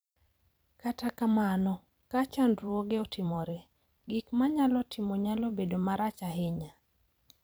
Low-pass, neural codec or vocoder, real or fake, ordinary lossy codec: none; vocoder, 44.1 kHz, 128 mel bands every 256 samples, BigVGAN v2; fake; none